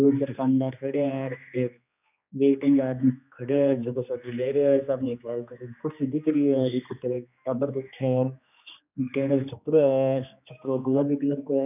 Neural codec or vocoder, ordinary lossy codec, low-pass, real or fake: codec, 16 kHz, 2 kbps, X-Codec, HuBERT features, trained on general audio; none; 3.6 kHz; fake